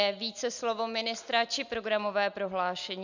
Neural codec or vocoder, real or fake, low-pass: none; real; 7.2 kHz